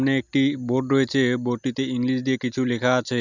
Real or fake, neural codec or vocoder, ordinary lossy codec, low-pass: real; none; none; 7.2 kHz